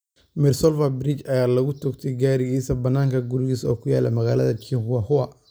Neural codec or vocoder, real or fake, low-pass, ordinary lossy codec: none; real; none; none